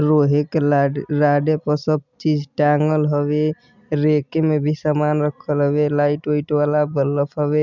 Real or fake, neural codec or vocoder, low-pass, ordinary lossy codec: real; none; 7.2 kHz; none